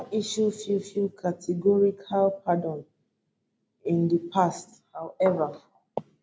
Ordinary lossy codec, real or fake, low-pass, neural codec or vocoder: none; real; none; none